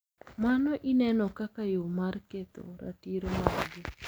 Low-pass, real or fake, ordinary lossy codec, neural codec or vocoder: none; real; none; none